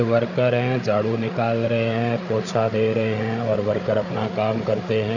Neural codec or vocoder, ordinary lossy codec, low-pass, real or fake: codec, 16 kHz, 8 kbps, FreqCodec, larger model; none; 7.2 kHz; fake